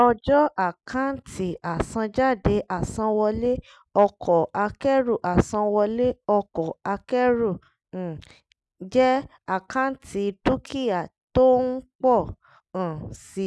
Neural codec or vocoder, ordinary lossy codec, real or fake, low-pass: none; none; real; none